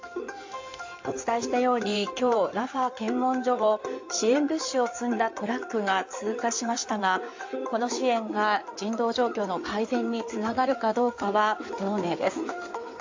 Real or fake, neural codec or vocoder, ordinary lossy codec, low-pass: fake; codec, 16 kHz in and 24 kHz out, 2.2 kbps, FireRedTTS-2 codec; AAC, 48 kbps; 7.2 kHz